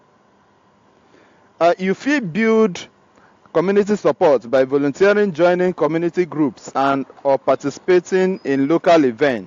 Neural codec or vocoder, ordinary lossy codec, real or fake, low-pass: none; AAC, 48 kbps; real; 7.2 kHz